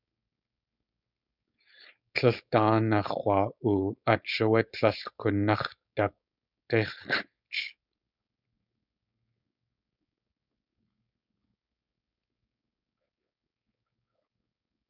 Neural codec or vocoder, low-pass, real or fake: codec, 16 kHz, 4.8 kbps, FACodec; 5.4 kHz; fake